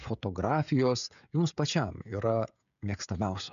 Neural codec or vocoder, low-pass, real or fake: codec, 16 kHz, 16 kbps, FreqCodec, smaller model; 7.2 kHz; fake